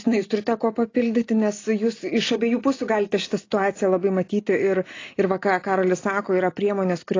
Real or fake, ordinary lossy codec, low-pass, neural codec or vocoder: real; AAC, 32 kbps; 7.2 kHz; none